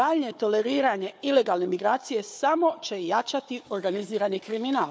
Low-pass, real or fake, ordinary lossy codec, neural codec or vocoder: none; fake; none; codec, 16 kHz, 4 kbps, FunCodec, trained on Chinese and English, 50 frames a second